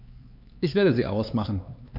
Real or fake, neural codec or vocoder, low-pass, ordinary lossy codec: fake; codec, 16 kHz, 4 kbps, X-Codec, HuBERT features, trained on LibriSpeech; 5.4 kHz; AAC, 48 kbps